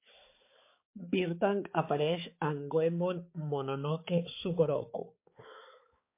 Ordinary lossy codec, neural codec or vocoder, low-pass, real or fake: MP3, 24 kbps; codec, 16 kHz, 4 kbps, X-Codec, HuBERT features, trained on balanced general audio; 3.6 kHz; fake